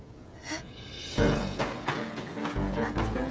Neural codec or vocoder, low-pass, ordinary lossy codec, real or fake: codec, 16 kHz, 16 kbps, FreqCodec, smaller model; none; none; fake